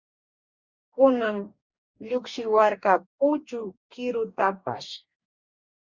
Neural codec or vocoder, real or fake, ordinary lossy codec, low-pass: codec, 44.1 kHz, 2.6 kbps, DAC; fake; Opus, 64 kbps; 7.2 kHz